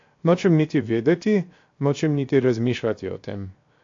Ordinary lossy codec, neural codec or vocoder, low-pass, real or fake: AAC, 48 kbps; codec, 16 kHz, 0.7 kbps, FocalCodec; 7.2 kHz; fake